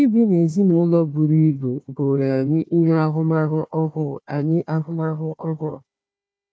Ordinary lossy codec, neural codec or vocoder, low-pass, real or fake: none; codec, 16 kHz, 1 kbps, FunCodec, trained on Chinese and English, 50 frames a second; none; fake